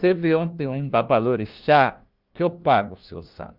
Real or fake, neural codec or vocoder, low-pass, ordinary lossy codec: fake; codec, 16 kHz, 1 kbps, FunCodec, trained on LibriTTS, 50 frames a second; 5.4 kHz; Opus, 32 kbps